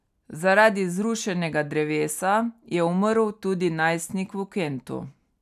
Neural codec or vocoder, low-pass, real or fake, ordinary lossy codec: none; 14.4 kHz; real; none